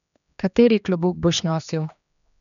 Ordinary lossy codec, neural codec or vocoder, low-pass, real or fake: none; codec, 16 kHz, 2 kbps, X-Codec, HuBERT features, trained on general audio; 7.2 kHz; fake